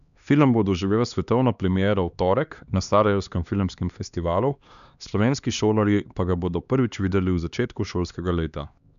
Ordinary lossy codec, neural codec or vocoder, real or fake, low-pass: none; codec, 16 kHz, 2 kbps, X-Codec, HuBERT features, trained on LibriSpeech; fake; 7.2 kHz